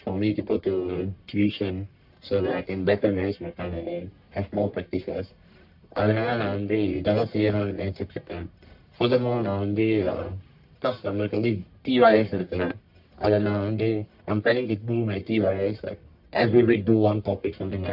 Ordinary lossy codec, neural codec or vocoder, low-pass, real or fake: AAC, 48 kbps; codec, 44.1 kHz, 1.7 kbps, Pupu-Codec; 5.4 kHz; fake